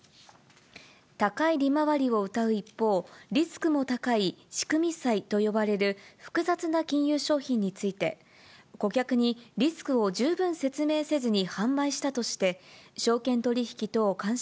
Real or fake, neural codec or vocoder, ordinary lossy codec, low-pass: real; none; none; none